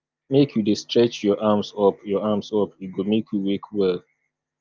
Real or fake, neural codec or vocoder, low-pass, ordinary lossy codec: real; none; 7.2 kHz; Opus, 24 kbps